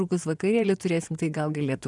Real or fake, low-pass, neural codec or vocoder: fake; 10.8 kHz; vocoder, 24 kHz, 100 mel bands, Vocos